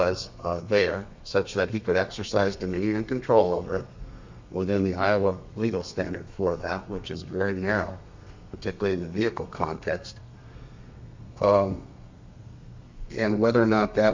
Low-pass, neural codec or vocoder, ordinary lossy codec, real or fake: 7.2 kHz; codec, 32 kHz, 1.9 kbps, SNAC; MP3, 64 kbps; fake